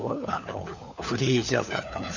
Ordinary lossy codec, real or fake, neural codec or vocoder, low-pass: none; fake; codec, 16 kHz, 4 kbps, FunCodec, trained on LibriTTS, 50 frames a second; 7.2 kHz